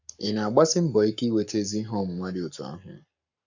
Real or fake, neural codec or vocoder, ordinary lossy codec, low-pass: fake; codec, 44.1 kHz, 7.8 kbps, Pupu-Codec; none; 7.2 kHz